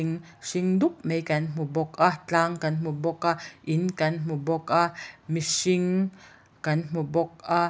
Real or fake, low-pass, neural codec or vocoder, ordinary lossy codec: real; none; none; none